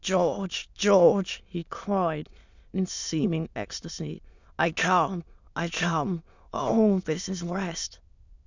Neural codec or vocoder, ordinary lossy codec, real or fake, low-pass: autoencoder, 22.05 kHz, a latent of 192 numbers a frame, VITS, trained on many speakers; Opus, 64 kbps; fake; 7.2 kHz